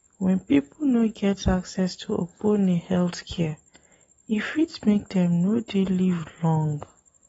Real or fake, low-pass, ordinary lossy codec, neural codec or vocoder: real; 19.8 kHz; AAC, 24 kbps; none